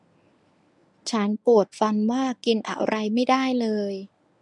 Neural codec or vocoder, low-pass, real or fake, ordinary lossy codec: codec, 24 kHz, 0.9 kbps, WavTokenizer, medium speech release version 1; 10.8 kHz; fake; none